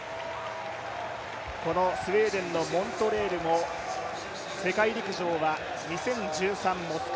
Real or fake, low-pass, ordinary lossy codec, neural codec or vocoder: real; none; none; none